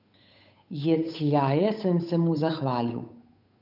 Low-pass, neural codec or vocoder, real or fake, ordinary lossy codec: 5.4 kHz; codec, 16 kHz, 8 kbps, FunCodec, trained on Chinese and English, 25 frames a second; fake; none